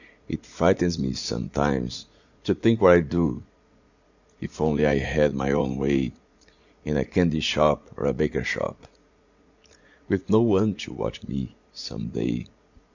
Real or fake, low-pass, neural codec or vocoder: fake; 7.2 kHz; vocoder, 44.1 kHz, 80 mel bands, Vocos